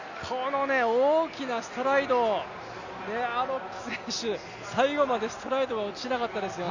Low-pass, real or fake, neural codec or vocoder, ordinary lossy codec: 7.2 kHz; real; none; none